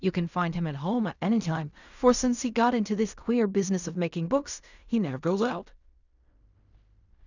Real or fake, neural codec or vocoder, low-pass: fake; codec, 16 kHz in and 24 kHz out, 0.4 kbps, LongCat-Audio-Codec, fine tuned four codebook decoder; 7.2 kHz